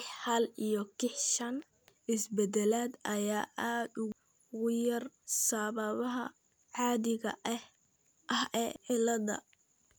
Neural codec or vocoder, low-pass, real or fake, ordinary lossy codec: none; none; real; none